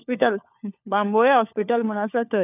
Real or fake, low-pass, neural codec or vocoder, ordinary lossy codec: fake; 3.6 kHz; codec, 16 kHz, 4 kbps, FunCodec, trained on LibriTTS, 50 frames a second; none